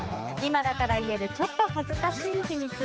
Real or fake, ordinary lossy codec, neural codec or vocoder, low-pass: fake; none; codec, 16 kHz, 4 kbps, X-Codec, HuBERT features, trained on general audio; none